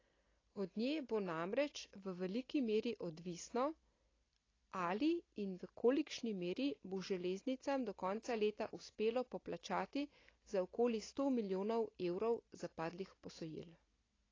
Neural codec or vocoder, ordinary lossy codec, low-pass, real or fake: none; AAC, 32 kbps; 7.2 kHz; real